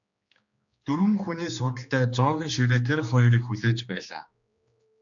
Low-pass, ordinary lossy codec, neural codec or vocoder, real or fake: 7.2 kHz; AAC, 64 kbps; codec, 16 kHz, 2 kbps, X-Codec, HuBERT features, trained on general audio; fake